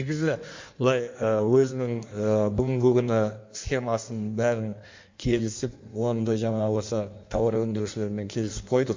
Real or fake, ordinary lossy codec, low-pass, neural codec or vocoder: fake; MP3, 48 kbps; 7.2 kHz; codec, 16 kHz in and 24 kHz out, 1.1 kbps, FireRedTTS-2 codec